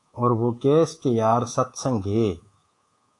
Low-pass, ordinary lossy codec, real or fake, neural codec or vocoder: 10.8 kHz; AAC, 48 kbps; fake; codec, 24 kHz, 3.1 kbps, DualCodec